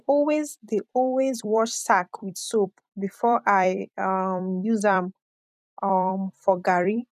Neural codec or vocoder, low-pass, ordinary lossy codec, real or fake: vocoder, 44.1 kHz, 128 mel bands every 256 samples, BigVGAN v2; 14.4 kHz; none; fake